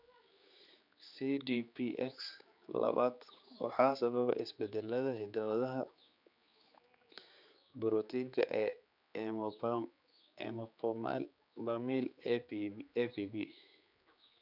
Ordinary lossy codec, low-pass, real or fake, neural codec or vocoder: none; 5.4 kHz; fake; codec, 16 kHz, 4 kbps, X-Codec, HuBERT features, trained on general audio